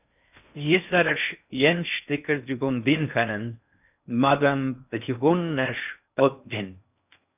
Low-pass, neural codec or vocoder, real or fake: 3.6 kHz; codec, 16 kHz in and 24 kHz out, 0.6 kbps, FocalCodec, streaming, 2048 codes; fake